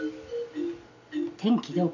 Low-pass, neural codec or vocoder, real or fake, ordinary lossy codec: 7.2 kHz; none; real; none